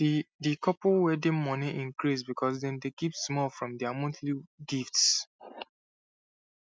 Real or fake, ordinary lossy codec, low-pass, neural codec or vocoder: real; none; none; none